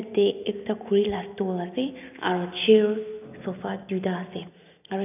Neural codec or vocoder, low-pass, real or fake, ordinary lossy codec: none; 3.6 kHz; real; none